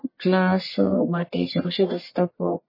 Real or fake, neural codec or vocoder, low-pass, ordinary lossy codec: fake; codec, 44.1 kHz, 1.7 kbps, Pupu-Codec; 5.4 kHz; MP3, 24 kbps